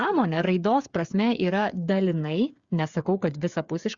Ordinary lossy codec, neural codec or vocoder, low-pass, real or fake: Opus, 64 kbps; codec, 16 kHz, 8 kbps, FreqCodec, smaller model; 7.2 kHz; fake